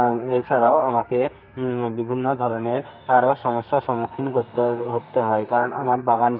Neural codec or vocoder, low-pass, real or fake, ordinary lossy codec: codec, 32 kHz, 1.9 kbps, SNAC; 5.4 kHz; fake; none